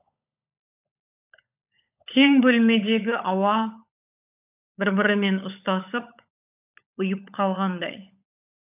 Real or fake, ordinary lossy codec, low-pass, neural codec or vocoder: fake; AAC, 32 kbps; 3.6 kHz; codec, 16 kHz, 16 kbps, FunCodec, trained on LibriTTS, 50 frames a second